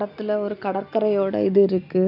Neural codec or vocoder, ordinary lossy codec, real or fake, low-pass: none; none; real; 5.4 kHz